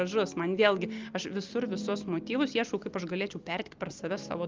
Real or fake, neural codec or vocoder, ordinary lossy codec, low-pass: real; none; Opus, 32 kbps; 7.2 kHz